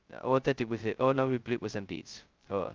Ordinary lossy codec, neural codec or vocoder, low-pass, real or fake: Opus, 24 kbps; codec, 16 kHz, 0.2 kbps, FocalCodec; 7.2 kHz; fake